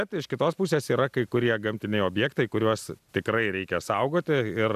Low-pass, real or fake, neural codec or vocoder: 14.4 kHz; real; none